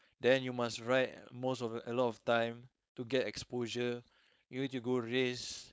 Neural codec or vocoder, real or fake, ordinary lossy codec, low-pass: codec, 16 kHz, 4.8 kbps, FACodec; fake; none; none